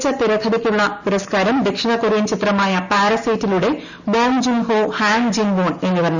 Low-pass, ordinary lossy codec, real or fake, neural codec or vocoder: 7.2 kHz; none; real; none